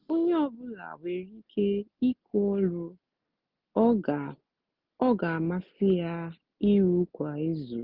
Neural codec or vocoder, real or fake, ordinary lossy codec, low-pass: none; real; Opus, 24 kbps; 5.4 kHz